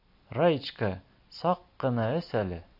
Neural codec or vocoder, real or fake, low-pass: none; real; 5.4 kHz